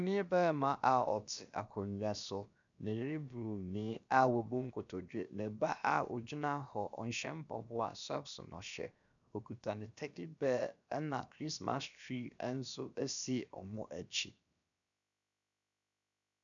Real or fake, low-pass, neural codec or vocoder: fake; 7.2 kHz; codec, 16 kHz, about 1 kbps, DyCAST, with the encoder's durations